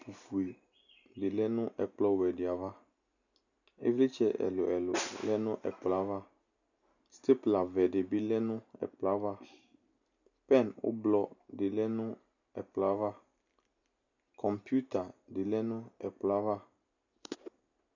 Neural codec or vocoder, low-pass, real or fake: none; 7.2 kHz; real